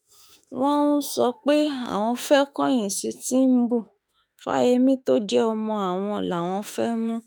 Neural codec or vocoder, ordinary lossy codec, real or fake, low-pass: autoencoder, 48 kHz, 32 numbers a frame, DAC-VAE, trained on Japanese speech; none; fake; none